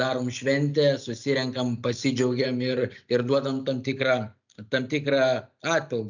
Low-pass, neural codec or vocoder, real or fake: 7.2 kHz; none; real